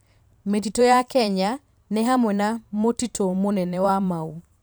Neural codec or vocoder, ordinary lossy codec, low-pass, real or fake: vocoder, 44.1 kHz, 128 mel bands every 512 samples, BigVGAN v2; none; none; fake